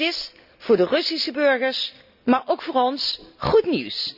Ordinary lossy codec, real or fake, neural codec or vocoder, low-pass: none; real; none; 5.4 kHz